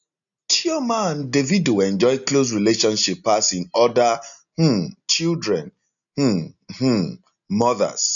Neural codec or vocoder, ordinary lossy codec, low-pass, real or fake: none; none; 7.2 kHz; real